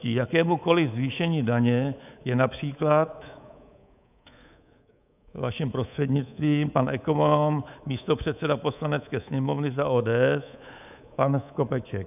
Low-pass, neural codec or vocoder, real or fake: 3.6 kHz; codec, 24 kHz, 3.1 kbps, DualCodec; fake